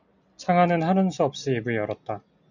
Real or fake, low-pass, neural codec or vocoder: real; 7.2 kHz; none